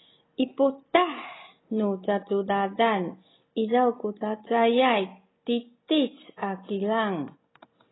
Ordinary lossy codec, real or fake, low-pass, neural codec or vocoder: AAC, 16 kbps; real; 7.2 kHz; none